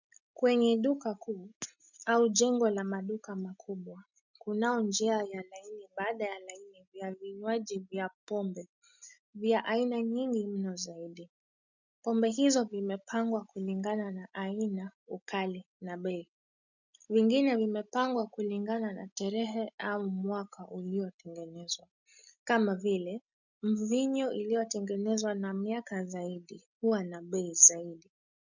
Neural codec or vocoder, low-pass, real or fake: none; 7.2 kHz; real